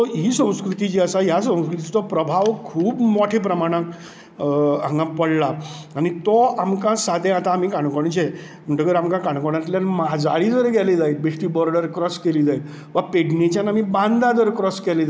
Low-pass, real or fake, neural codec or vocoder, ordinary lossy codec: none; real; none; none